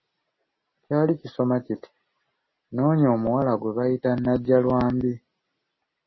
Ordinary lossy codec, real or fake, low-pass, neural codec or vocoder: MP3, 24 kbps; real; 7.2 kHz; none